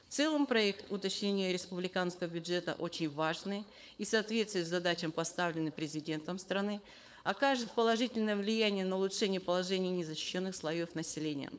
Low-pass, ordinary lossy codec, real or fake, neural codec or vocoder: none; none; fake; codec, 16 kHz, 4.8 kbps, FACodec